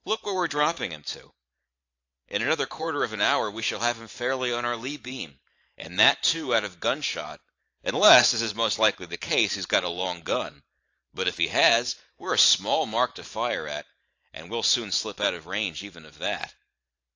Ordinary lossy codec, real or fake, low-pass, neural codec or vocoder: AAC, 48 kbps; fake; 7.2 kHz; vocoder, 44.1 kHz, 128 mel bands every 512 samples, BigVGAN v2